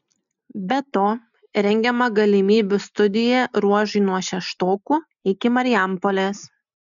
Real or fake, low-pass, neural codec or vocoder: real; 7.2 kHz; none